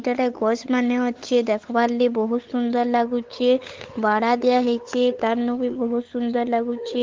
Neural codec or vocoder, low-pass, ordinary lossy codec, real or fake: codec, 16 kHz, 8 kbps, FunCodec, trained on LibriTTS, 25 frames a second; 7.2 kHz; Opus, 16 kbps; fake